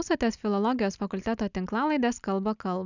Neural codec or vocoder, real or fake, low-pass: none; real; 7.2 kHz